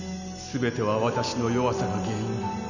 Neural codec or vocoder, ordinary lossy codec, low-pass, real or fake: none; none; 7.2 kHz; real